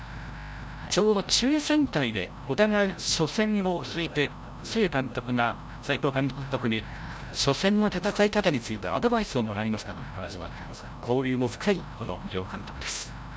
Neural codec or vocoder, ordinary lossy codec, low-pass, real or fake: codec, 16 kHz, 0.5 kbps, FreqCodec, larger model; none; none; fake